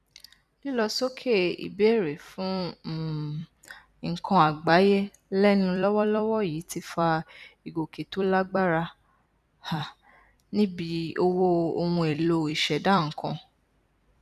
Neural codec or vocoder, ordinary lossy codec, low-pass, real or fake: vocoder, 44.1 kHz, 128 mel bands every 256 samples, BigVGAN v2; none; 14.4 kHz; fake